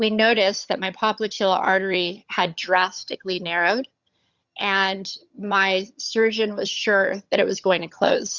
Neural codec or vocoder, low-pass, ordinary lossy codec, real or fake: vocoder, 22.05 kHz, 80 mel bands, HiFi-GAN; 7.2 kHz; Opus, 64 kbps; fake